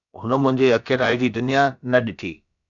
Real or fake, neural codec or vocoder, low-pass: fake; codec, 16 kHz, about 1 kbps, DyCAST, with the encoder's durations; 7.2 kHz